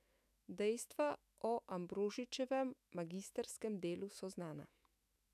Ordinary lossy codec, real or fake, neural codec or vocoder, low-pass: none; fake; autoencoder, 48 kHz, 128 numbers a frame, DAC-VAE, trained on Japanese speech; 14.4 kHz